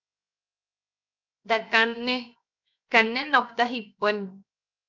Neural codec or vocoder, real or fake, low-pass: codec, 16 kHz, 0.7 kbps, FocalCodec; fake; 7.2 kHz